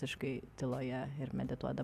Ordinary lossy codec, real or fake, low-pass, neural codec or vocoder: Opus, 64 kbps; real; 14.4 kHz; none